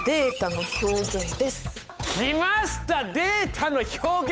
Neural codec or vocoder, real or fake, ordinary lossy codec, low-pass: codec, 16 kHz, 8 kbps, FunCodec, trained on Chinese and English, 25 frames a second; fake; none; none